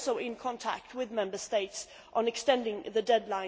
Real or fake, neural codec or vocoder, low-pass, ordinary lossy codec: real; none; none; none